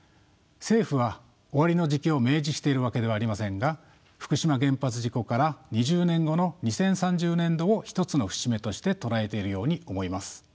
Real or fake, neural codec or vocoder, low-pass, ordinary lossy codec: real; none; none; none